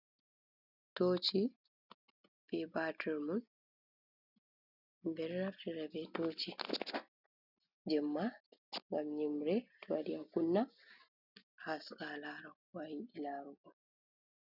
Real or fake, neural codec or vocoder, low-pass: real; none; 5.4 kHz